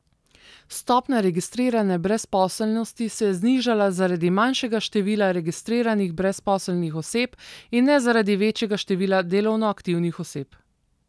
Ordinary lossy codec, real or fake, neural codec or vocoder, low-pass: none; real; none; none